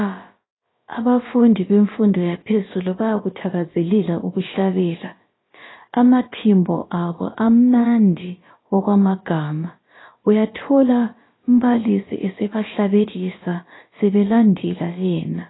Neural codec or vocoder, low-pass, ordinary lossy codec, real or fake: codec, 16 kHz, about 1 kbps, DyCAST, with the encoder's durations; 7.2 kHz; AAC, 16 kbps; fake